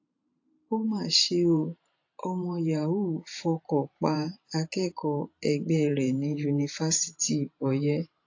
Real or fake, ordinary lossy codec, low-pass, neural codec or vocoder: fake; AAC, 48 kbps; 7.2 kHz; vocoder, 44.1 kHz, 128 mel bands every 512 samples, BigVGAN v2